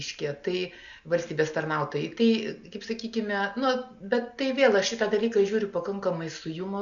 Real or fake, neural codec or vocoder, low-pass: real; none; 7.2 kHz